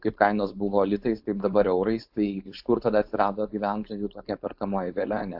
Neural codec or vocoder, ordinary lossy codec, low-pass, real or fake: codec, 16 kHz, 4.8 kbps, FACodec; AAC, 48 kbps; 5.4 kHz; fake